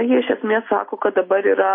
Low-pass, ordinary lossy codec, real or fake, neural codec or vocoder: 5.4 kHz; MP3, 24 kbps; real; none